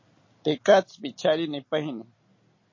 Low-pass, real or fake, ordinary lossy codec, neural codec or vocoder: 7.2 kHz; real; MP3, 32 kbps; none